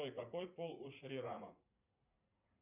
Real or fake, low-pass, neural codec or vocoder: fake; 3.6 kHz; vocoder, 44.1 kHz, 80 mel bands, Vocos